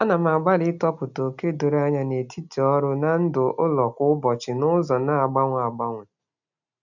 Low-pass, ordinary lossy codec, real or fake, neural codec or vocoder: 7.2 kHz; none; real; none